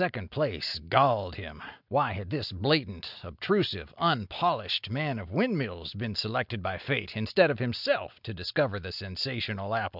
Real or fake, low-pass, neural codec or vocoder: real; 5.4 kHz; none